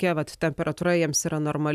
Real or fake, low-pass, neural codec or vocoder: real; 14.4 kHz; none